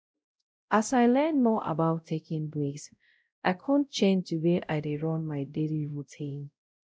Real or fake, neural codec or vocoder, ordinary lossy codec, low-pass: fake; codec, 16 kHz, 0.5 kbps, X-Codec, WavLM features, trained on Multilingual LibriSpeech; none; none